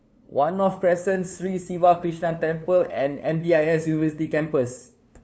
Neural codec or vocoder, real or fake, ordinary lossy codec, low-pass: codec, 16 kHz, 2 kbps, FunCodec, trained on LibriTTS, 25 frames a second; fake; none; none